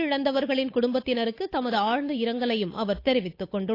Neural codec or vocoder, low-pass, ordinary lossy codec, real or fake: codec, 16 kHz, 8 kbps, FunCodec, trained on Chinese and English, 25 frames a second; 5.4 kHz; AAC, 32 kbps; fake